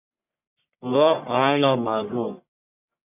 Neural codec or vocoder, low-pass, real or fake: codec, 44.1 kHz, 1.7 kbps, Pupu-Codec; 3.6 kHz; fake